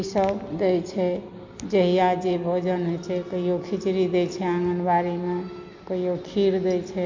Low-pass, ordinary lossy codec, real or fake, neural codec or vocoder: 7.2 kHz; MP3, 48 kbps; real; none